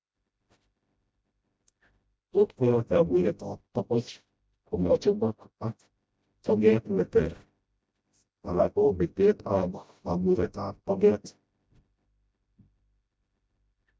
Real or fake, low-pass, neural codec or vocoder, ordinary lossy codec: fake; none; codec, 16 kHz, 0.5 kbps, FreqCodec, smaller model; none